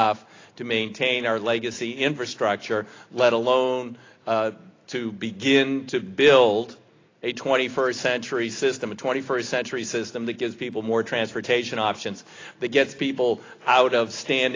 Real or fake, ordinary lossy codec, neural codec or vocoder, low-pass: real; AAC, 32 kbps; none; 7.2 kHz